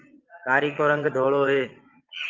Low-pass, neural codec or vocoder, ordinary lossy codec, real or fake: 7.2 kHz; vocoder, 22.05 kHz, 80 mel bands, Vocos; Opus, 24 kbps; fake